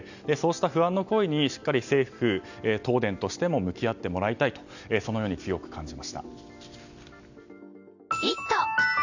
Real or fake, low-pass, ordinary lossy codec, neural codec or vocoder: real; 7.2 kHz; none; none